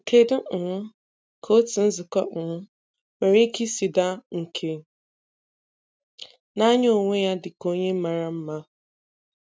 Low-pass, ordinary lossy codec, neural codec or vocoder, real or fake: none; none; none; real